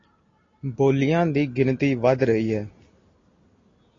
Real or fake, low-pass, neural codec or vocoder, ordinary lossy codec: real; 7.2 kHz; none; AAC, 32 kbps